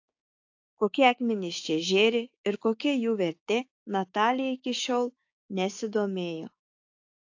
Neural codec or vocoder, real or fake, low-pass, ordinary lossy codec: codec, 16 kHz, 6 kbps, DAC; fake; 7.2 kHz; AAC, 48 kbps